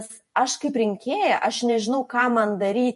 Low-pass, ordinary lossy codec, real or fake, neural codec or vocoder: 14.4 kHz; MP3, 48 kbps; fake; vocoder, 48 kHz, 128 mel bands, Vocos